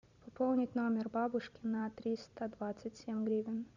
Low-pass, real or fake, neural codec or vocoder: 7.2 kHz; fake; vocoder, 44.1 kHz, 128 mel bands every 256 samples, BigVGAN v2